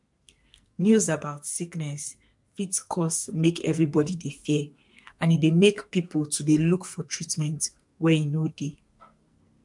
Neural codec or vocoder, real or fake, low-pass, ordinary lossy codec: codec, 44.1 kHz, 2.6 kbps, SNAC; fake; 10.8 kHz; MP3, 64 kbps